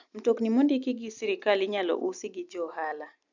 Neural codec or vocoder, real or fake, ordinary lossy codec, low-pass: none; real; none; 7.2 kHz